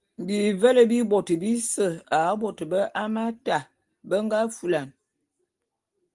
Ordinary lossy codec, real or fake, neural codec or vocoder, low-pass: Opus, 24 kbps; real; none; 10.8 kHz